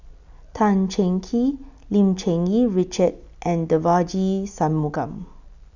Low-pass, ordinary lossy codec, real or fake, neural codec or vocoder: 7.2 kHz; none; real; none